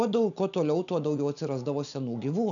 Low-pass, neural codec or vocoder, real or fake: 7.2 kHz; none; real